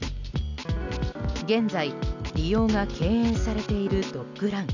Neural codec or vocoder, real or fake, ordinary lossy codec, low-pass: none; real; none; 7.2 kHz